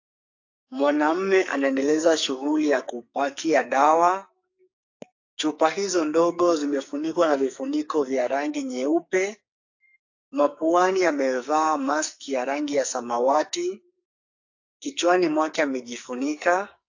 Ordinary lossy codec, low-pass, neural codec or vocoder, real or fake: AAC, 48 kbps; 7.2 kHz; codec, 44.1 kHz, 2.6 kbps, SNAC; fake